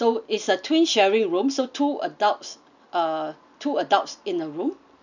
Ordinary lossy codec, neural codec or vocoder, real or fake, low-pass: none; none; real; 7.2 kHz